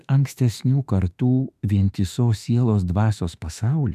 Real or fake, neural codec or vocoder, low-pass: fake; autoencoder, 48 kHz, 32 numbers a frame, DAC-VAE, trained on Japanese speech; 14.4 kHz